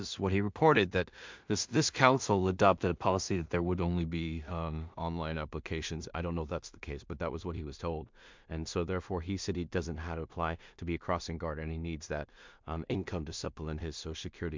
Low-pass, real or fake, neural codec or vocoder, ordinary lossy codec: 7.2 kHz; fake; codec, 16 kHz in and 24 kHz out, 0.4 kbps, LongCat-Audio-Codec, two codebook decoder; MP3, 64 kbps